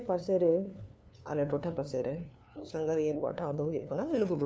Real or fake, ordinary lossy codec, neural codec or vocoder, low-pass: fake; none; codec, 16 kHz, 2 kbps, FunCodec, trained on LibriTTS, 25 frames a second; none